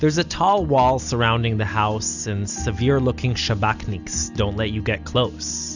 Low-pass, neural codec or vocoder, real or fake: 7.2 kHz; none; real